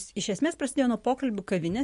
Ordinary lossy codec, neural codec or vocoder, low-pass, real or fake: MP3, 48 kbps; none; 14.4 kHz; real